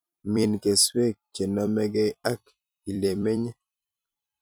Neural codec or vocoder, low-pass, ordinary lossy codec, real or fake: vocoder, 44.1 kHz, 128 mel bands every 256 samples, BigVGAN v2; none; none; fake